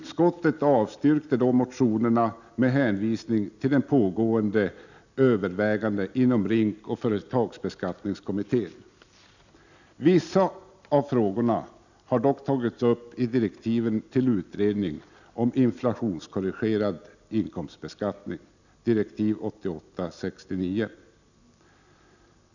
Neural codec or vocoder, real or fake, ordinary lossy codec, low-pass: none; real; none; 7.2 kHz